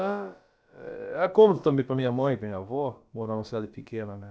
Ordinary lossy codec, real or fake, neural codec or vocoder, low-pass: none; fake; codec, 16 kHz, about 1 kbps, DyCAST, with the encoder's durations; none